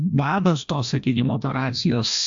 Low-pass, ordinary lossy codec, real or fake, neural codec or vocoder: 7.2 kHz; AAC, 64 kbps; fake; codec, 16 kHz, 1 kbps, FreqCodec, larger model